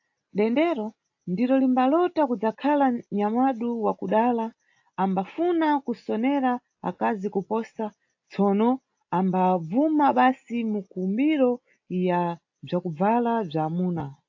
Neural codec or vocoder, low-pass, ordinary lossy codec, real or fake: none; 7.2 kHz; AAC, 48 kbps; real